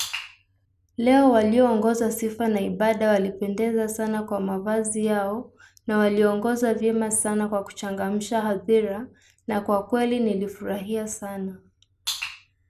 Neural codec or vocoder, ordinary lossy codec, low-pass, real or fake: none; none; 14.4 kHz; real